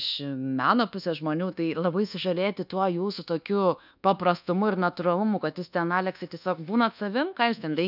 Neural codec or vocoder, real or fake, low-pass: codec, 24 kHz, 1.2 kbps, DualCodec; fake; 5.4 kHz